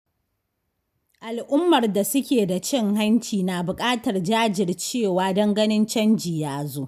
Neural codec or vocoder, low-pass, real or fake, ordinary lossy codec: none; 14.4 kHz; real; none